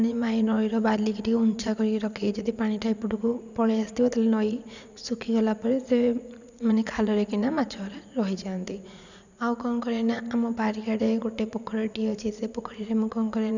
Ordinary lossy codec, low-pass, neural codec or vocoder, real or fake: none; 7.2 kHz; vocoder, 44.1 kHz, 80 mel bands, Vocos; fake